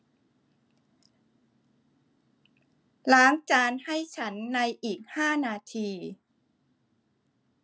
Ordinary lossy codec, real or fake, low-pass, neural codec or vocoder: none; real; none; none